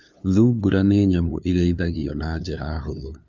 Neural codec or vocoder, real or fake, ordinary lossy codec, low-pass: codec, 16 kHz, 2 kbps, FunCodec, trained on LibriTTS, 25 frames a second; fake; none; none